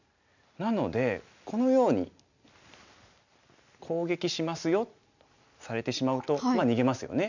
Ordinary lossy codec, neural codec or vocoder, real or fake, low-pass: none; none; real; 7.2 kHz